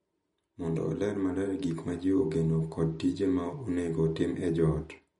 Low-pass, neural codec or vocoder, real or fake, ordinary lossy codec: 19.8 kHz; none; real; MP3, 48 kbps